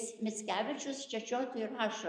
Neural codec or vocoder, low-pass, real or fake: vocoder, 44.1 kHz, 128 mel bands every 256 samples, BigVGAN v2; 9.9 kHz; fake